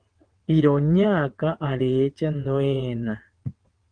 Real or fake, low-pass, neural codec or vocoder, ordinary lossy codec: fake; 9.9 kHz; vocoder, 22.05 kHz, 80 mel bands, WaveNeXt; Opus, 24 kbps